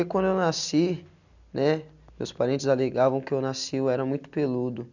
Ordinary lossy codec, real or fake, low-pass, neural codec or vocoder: none; real; 7.2 kHz; none